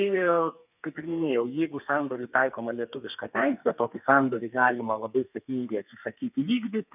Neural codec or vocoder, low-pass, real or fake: codec, 32 kHz, 1.9 kbps, SNAC; 3.6 kHz; fake